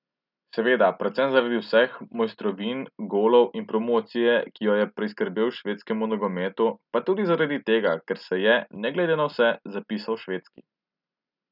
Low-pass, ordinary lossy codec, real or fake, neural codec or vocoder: 5.4 kHz; none; real; none